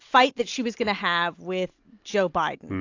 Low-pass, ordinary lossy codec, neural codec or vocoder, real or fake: 7.2 kHz; AAC, 48 kbps; none; real